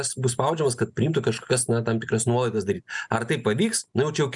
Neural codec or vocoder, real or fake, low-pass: none; real; 10.8 kHz